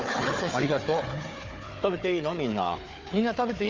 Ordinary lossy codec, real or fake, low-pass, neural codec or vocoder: Opus, 32 kbps; fake; 7.2 kHz; codec, 16 kHz, 4 kbps, FunCodec, trained on Chinese and English, 50 frames a second